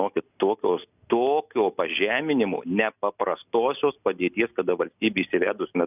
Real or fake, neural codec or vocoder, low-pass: real; none; 3.6 kHz